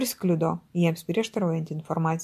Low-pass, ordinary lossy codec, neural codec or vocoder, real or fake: 14.4 kHz; MP3, 64 kbps; none; real